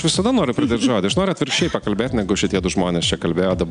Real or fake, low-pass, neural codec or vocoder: real; 9.9 kHz; none